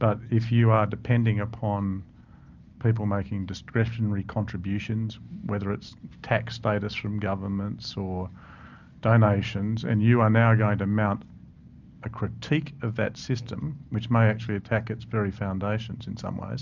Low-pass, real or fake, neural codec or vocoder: 7.2 kHz; real; none